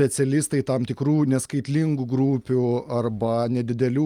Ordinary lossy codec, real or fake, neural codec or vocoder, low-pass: Opus, 32 kbps; real; none; 19.8 kHz